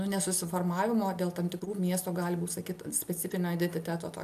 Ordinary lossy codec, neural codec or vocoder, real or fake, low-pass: MP3, 96 kbps; none; real; 14.4 kHz